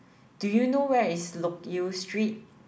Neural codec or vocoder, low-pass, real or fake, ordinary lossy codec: none; none; real; none